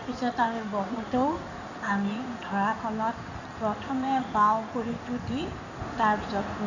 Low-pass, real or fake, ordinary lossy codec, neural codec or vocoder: 7.2 kHz; fake; none; codec, 16 kHz in and 24 kHz out, 2.2 kbps, FireRedTTS-2 codec